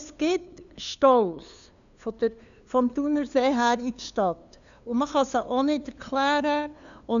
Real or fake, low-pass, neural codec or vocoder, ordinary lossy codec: fake; 7.2 kHz; codec, 16 kHz, 2 kbps, FunCodec, trained on LibriTTS, 25 frames a second; none